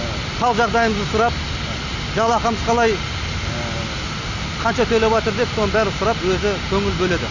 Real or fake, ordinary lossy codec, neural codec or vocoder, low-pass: real; none; none; 7.2 kHz